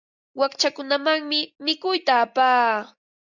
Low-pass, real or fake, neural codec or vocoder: 7.2 kHz; real; none